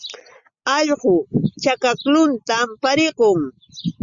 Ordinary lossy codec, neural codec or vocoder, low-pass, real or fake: Opus, 64 kbps; none; 7.2 kHz; real